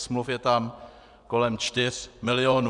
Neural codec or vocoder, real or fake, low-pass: vocoder, 24 kHz, 100 mel bands, Vocos; fake; 10.8 kHz